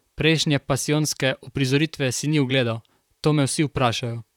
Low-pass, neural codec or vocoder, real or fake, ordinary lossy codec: 19.8 kHz; vocoder, 44.1 kHz, 128 mel bands, Pupu-Vocoder; fake; none